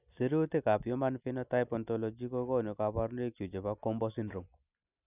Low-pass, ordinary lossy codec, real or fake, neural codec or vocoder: 3.6 kHz; none; real; none